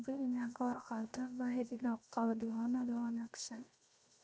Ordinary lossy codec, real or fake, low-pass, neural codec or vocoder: none; fake; none; codec, 16 kHz, 0.7 kbps, FocalCodec